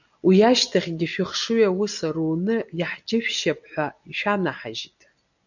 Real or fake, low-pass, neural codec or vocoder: real; 7.2 kHz; none